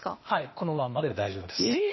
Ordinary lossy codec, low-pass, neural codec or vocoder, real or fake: MP3, 24 kbps; 7.2 kHz; codec, 16 kHz, 0.8 kbps, ZipCodec; fake